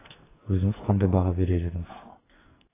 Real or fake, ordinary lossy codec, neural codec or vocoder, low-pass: fake; AAC, 16 kbps; codec, 44.1 kHz, 2.6 kbps, SNAC; 3.6 kHz